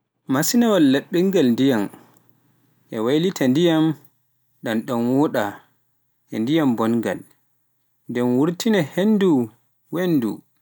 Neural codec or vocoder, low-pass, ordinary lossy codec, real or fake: none; none; none; real